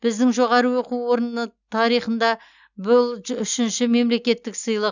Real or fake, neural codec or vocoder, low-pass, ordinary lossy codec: real; none; 7.2 kHz; none